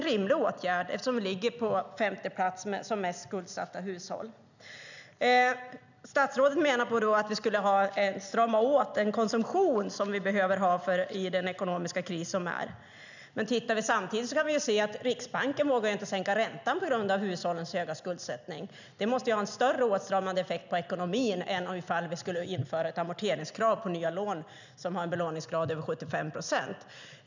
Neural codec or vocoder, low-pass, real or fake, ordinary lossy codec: none; 7.2 kHz; real; none